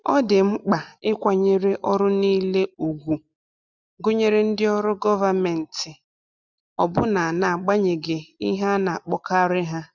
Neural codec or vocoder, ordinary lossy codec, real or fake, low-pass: none; none; real; 7.2 kHz